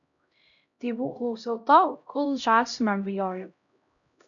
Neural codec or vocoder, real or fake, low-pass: codec, 16 kHz, 0.5 kbps, X-Codec, HuBERT features, trained on LibriSpeech; fake; 7.2 kHz